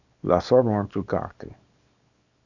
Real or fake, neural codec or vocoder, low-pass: fake; codec, 24 kHz, 0.9 kbps, WavTokenizer, small release; 7.2 kHz